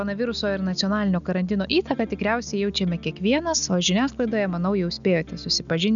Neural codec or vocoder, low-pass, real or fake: none; 7.2 kHz; real